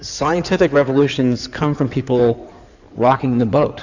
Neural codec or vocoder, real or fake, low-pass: codec, 16 kHz in and 24 kHz out, 2.2 kbps, FireRedTTS-2 codec; fake; 7.2 kHz